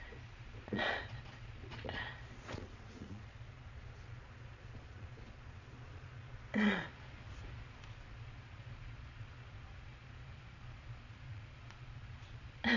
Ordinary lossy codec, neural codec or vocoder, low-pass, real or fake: none; vocoder, 22.05 kHz, 80 mel bands, Vocos; 7.2 kHz; fake